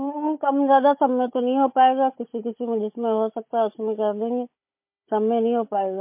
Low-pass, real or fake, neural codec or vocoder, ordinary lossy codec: 3.6 kHz; fake; codec, 16 kHz, 16 kbps, FunCodec, trained on Chinese and English, 50 frames a second; MP3, 24 kbps